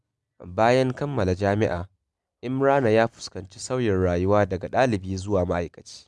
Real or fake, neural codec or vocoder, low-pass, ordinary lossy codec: real; none; none; none